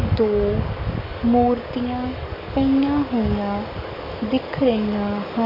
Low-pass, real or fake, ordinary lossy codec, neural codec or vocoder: 5.4 kHz; fake; none; codec, 44.1 kHz, 7.8 kbps, DAC